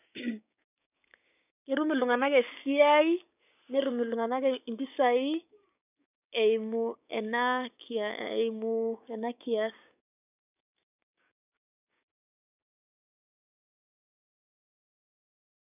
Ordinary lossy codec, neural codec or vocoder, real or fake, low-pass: none; codec, 16 kHz, 6 kbps, DAC; fake; 3.6 kHz